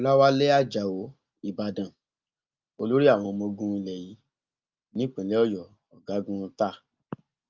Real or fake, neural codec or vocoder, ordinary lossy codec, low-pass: real; none; Opus, 24 kbps; 7.2 kHz